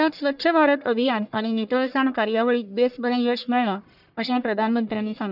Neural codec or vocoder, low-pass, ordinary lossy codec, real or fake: codec, 44.1 kHz, 1.7 kbps, Pupu-Codec; 5.4 kHz; none; fake